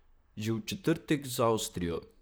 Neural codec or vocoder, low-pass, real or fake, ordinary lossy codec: vocoder, 44.1 kHz, 128 mel bands, Pupu-Vocoder; none; fake; none